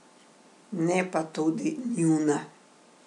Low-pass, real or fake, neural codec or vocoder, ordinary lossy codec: 10.8 kHz; real; none; none